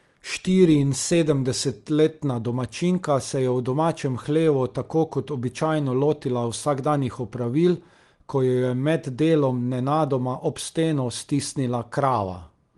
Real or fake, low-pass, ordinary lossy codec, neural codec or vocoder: real; 10.8 kHz; Opus, 24 kbps; none